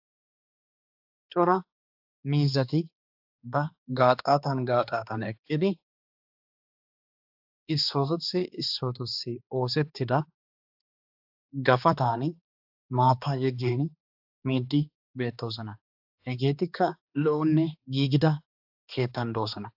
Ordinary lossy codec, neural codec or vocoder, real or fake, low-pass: AAC, 48 kbps; codec, 16 kHz, 2 kbps, X-Codec, HuBERT features, trained on balanced general audio; fake; 5.4 kHz